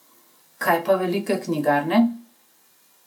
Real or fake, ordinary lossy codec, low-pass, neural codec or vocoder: real; none; 19.8 kHz; none